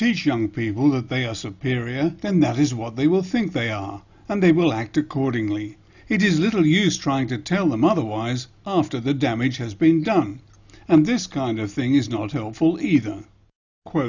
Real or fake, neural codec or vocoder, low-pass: real; none; 7.2 kHz